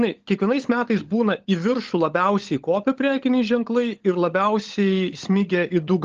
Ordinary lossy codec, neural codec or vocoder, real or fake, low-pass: Opus, 16 kbps; codec, 16 kHz, 16 kbps, FunCodec, trained on LibriTTS, 50 frames a second; fake; 7.2 kHz